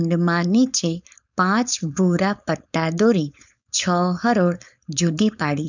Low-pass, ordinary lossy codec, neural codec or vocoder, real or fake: 7.2 kHz; none; codec, 16 kHz, 4.8 kbps, FACodec; fake